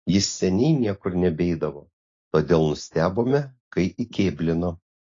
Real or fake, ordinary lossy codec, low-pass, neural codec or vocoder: real; AAC, 32 kbps; 7.2 kHz; none